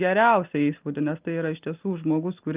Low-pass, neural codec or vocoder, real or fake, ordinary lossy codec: 3.6 kHz; none; real; Opus, 32 kbps